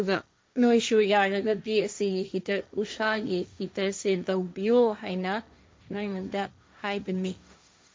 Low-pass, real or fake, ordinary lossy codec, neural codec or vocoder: none; fake; none; codec, 16 kHz, 1.1 kbps, Voila-Tokenizer